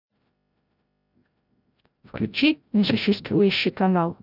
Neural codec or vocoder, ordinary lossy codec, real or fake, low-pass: codec, 16 kHz, 0.5 kbps, FreqCodec, larger model; none; fake; 5.4 kHz